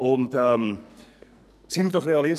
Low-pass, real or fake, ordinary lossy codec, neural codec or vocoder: 14.4 kHz; fake; none; codec, 44.1 kHz, 2.6 kbps, SNAC